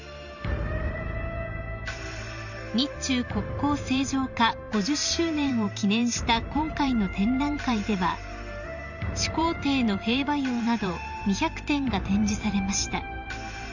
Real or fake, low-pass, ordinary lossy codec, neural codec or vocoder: fake; 7.2 kHz; none; vocoder, 44.1 kHz, 128 mel bands every 512 samples, BigVGAN v2